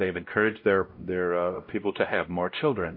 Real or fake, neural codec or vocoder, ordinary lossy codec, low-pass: fake; codec, 16 kHz, 0.5 kbps, X-Codec, WavLM features, trained on Multilingual LibriSpeech; MP3, 32 kbps; 5.4 kHz